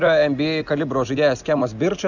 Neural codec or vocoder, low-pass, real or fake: none; 7.2 kHz; real